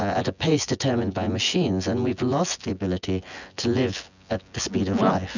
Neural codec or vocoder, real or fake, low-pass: vocoder, 24 kHz, 100 mel bands, Vocos; fake; 7.2 kHz